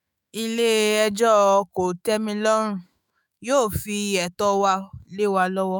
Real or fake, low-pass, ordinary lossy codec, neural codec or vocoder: fake; none; none; autoencoder, 48 kHz, 128 numbers a frame, DAC-VAE, trained on Japanese speech